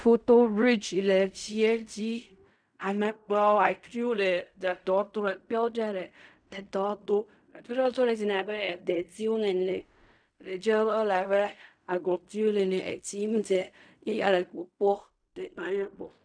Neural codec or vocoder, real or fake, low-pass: codec, 16 kHz in and 24 kHz out, 0.4 kbps, LongCat-Audio-Codec, fine tuned four codebook decoder; fake; 9.9 kHz